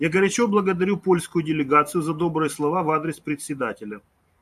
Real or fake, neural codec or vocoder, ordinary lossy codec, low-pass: fake; vocoder, 44.1 kHz, 128 mel bands every 512 samples, BigVGAN v2; AAC, 96 kbps; 14.4 kHz